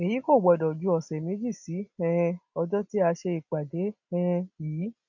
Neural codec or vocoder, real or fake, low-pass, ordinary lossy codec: none; real; 7.2 kHz; none